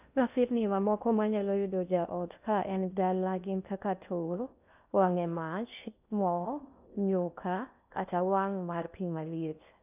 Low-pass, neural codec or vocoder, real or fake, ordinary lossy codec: 3.6 kHz; codec, 16 kHz in and 24 kHz out, 0.6 kbps, FocalCodec, streaming, 2048 codes; fake; none